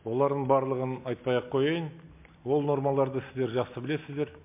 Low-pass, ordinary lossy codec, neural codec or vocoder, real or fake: 3.6 kHz; MP3, 32 kbps; none; real